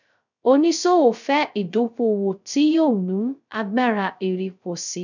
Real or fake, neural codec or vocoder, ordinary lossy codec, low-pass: fake; codec, 16 kHz, 0.2 kbps, FocalCodec; none; 7.2 kHz